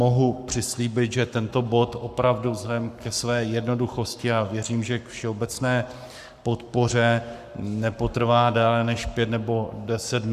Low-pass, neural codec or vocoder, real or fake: 14.4 kHz; codec, 44.1 kHz, 7.8 kbps, Pupu-Codec; fake